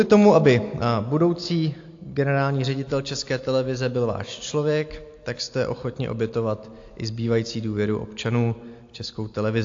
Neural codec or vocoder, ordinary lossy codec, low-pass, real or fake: none; AAC, 48 kbps; 7.2 kHz; real